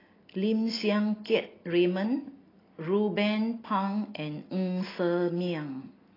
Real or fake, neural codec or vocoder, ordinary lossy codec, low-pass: real; none; AAC, 24 kbps; 5.4 kHz